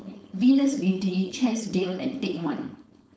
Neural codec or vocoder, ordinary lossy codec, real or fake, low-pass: codec, 16 kHz, 4.8 kbps, FACodec; none; fake; none